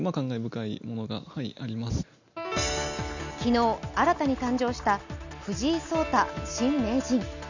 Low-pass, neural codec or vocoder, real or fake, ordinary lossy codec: 7.2 kHz; none; real; none